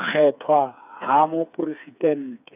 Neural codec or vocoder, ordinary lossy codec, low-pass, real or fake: codec, 16 kHz, 4 kbps, FreqCodec, smaller model; none; 3.6 kHz; fake